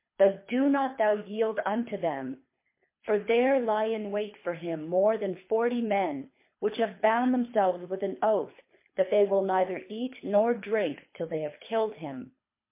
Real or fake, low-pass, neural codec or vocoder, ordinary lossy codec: fake; 3.6 kHz; codec, 24 kHz, 3 kbps, HILCodec; MP3, 24 kbps